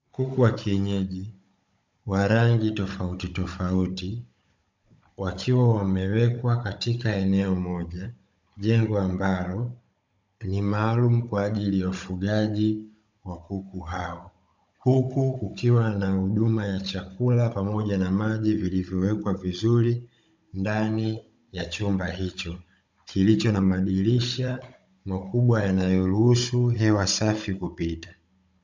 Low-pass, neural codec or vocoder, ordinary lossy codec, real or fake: 7.2 kHz; codec, 16 kHz, 16 kbps, FunCodec, trained on Chinese and English, 50 frames a second; MP3, 64 kbps; fake